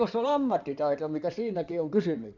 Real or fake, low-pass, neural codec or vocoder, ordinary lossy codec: fake; 7.2 kHz; codec, 16 kHz in and 24 kHz out, 2.2 kbps, FireRedTTS-2 codec; none